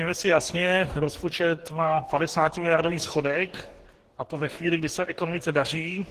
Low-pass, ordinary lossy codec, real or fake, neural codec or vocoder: 14.4 kHz; Opus, 16 kbps; fake; codec, 44.1 kHz, 2.6 kbps, DAC